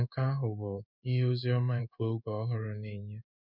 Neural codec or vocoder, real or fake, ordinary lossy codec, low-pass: codec, 16 kHz in and 24 kHz out, 1 kbps, XY-Tokenizer; fake; none; 5.4 kHz